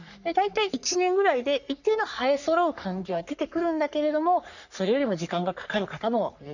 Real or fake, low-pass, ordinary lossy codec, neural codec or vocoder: fake; 7.2 kHz; none; codec, 44.1 kHz, 3.4 kbps, Pupu-Codec